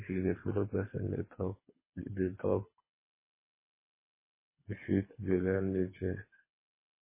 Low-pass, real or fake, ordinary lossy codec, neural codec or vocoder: 3.6 kHz; fake; MP3, 16 kbps; codec, 24 kHz, 3 kbps, HILCodec